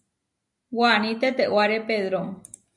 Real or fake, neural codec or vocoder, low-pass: real; none; 10.8 kHz